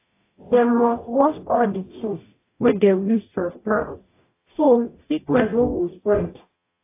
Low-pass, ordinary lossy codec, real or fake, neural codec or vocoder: 3.6 kHz; AAC, 24 kbps; fake; codec, 44.1 kHz, 0.9 kbps, DAC